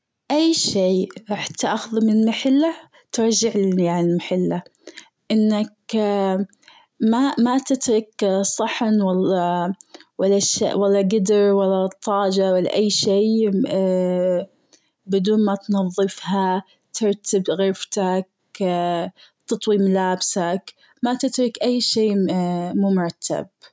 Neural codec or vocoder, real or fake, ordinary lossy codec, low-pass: none; real; none; none